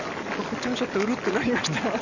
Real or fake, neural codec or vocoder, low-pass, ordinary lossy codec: real; none; 7.2 kHz; none